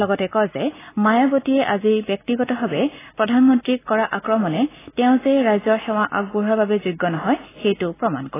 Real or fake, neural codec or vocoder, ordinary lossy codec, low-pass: real; none; AAC, 16 kbps; 3.6 kHz